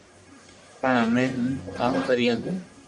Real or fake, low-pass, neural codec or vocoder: fake; 10.8 kHz; codec, 44.1 kHz, 1.7 kbps, Pupu-Codec